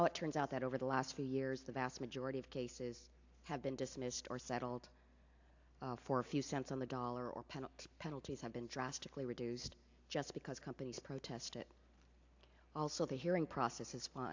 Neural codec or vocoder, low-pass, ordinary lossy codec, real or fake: none; 7.2 kHz; AAC, 48 kbps; real